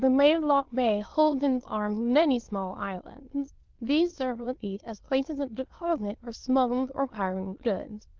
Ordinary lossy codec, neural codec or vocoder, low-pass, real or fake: Opus, 16 kbps; autoencoder, 22.05 kHz, a latent of 192 numbers a frame, VITS, trained on many speakers; 7.2 kHz; fake